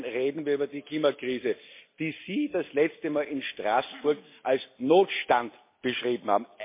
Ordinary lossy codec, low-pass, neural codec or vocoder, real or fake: MP3, 24 kbps; 3.6 kHz; none; real